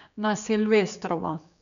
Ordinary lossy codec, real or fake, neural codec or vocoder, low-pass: none; fake; codec, 16 kHz, 4 kbps, X-Codec, HuBERT features, trained on general audio; 7.2 kHz